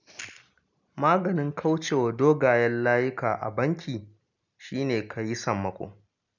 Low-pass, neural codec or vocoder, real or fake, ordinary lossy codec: 7.2 kHz; none; real; none